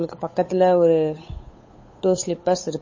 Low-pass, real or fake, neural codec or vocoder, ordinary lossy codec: 7.2 kHz; fake; codec, 16 kHz, 8 kbps, FunCodec, trained on Chinese and English, 25 frames a second; MP3, 32 kbps